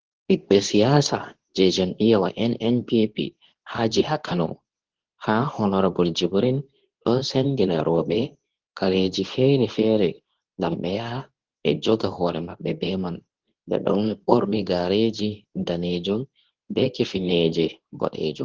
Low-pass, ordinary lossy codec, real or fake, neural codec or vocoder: 7.2 kHz; Opus, 16 kbps; fake; codec, 24 kHz, 0.9 kbps, WavTokenizer, medium speech release version 2